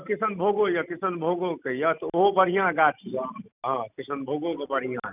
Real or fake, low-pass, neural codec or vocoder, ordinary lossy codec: real; 3.6 kHz; none; none